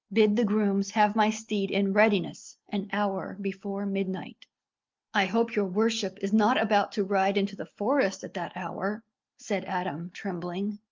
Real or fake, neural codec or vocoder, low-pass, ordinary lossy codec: real; none; 7.2 kHz; Opus, 24 kbps